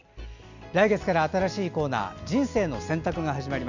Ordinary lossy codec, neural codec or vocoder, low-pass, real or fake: none; none; 7.2 kHz; real